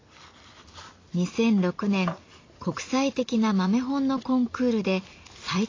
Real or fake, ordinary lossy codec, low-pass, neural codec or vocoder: real; AAC, 32 kbps; 7.2 kHz; none